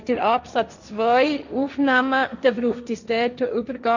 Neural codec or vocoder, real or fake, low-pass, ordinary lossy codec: codec, 16 kHz, 1.1 kbps, Voila-Tokenizer; fake; 7.2 kHz; none